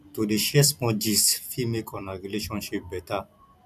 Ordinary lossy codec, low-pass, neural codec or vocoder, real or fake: none; 14.4 kHz; vocoder, 44.1 kHz, 128 mel bands every 256 samples, BigVGAN v2; fake